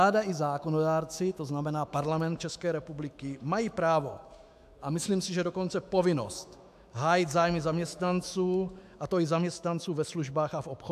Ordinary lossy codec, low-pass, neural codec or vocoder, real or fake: AAC, 96 kbps; 14.4 kHz; autoencoder, 48 kHz, 128 numbers a frame, DAC-VAE, trained on Japanese speech; fake